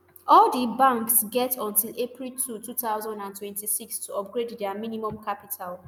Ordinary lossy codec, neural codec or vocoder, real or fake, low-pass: none; none; real; none